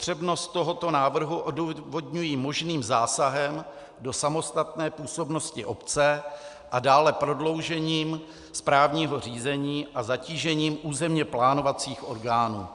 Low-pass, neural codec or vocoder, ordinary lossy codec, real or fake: 10.8 kHz; none; Opus, 64 kbps; real